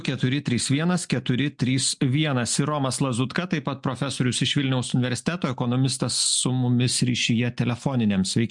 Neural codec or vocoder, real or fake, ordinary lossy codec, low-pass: none; real; MP3, 64 kbps; 10.8 kHz